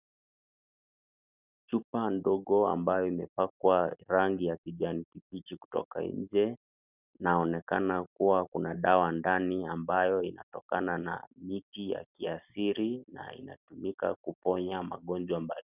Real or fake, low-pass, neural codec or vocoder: real; 3.6 kHz; none